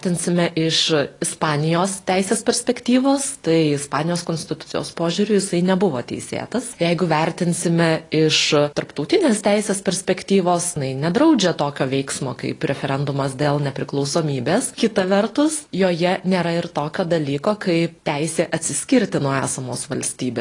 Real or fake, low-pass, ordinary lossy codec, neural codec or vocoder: real; 10.8 kHz; AAC, 32 kbps; none